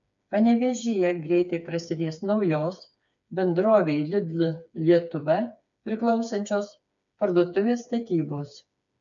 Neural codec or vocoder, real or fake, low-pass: codec, 16 kHz, 4 kbps, FreqCodec, smaller model; fake; 7.2 kHz